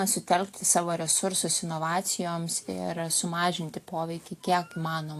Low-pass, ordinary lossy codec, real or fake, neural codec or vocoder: 14.4 kHz; AAC, 64 kbps; real; none